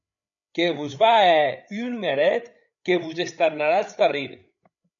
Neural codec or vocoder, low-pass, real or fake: codec, 16 kHz, 8 kbps, FreqCodec, larger model; 7.2 kHz; fake